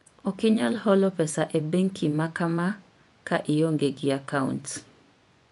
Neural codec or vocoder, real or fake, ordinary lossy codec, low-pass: vocoder, 24 kHz, 100 mel bands, Vocos; fake; none; 10.8 kHz